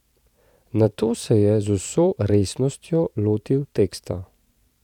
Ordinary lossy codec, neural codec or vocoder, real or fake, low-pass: none; vocoder, 44.1 kHz, 128 mel bands every 512 samples, BigVGAN v2; fake; 19.8 kHz